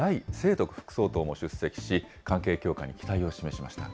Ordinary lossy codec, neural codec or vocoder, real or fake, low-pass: none; none; real; none